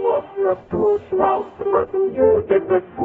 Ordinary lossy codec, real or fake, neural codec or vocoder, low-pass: AAC, 24 kbps; fake; codec, 44.1 kHz, 0.9 kbps, DAC; 19.8 kHz